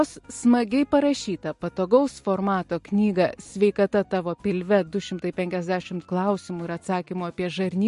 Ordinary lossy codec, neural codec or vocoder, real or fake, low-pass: MP3, 48 kbps; none; real; 14.4 kHz